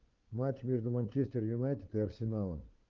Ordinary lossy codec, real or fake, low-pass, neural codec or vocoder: Opus, 32 kbps; fake; 7.2 kHz; codec, 16 kHz, 4 kbps, FunCodec, trained on LibriTTS, 50 frames a second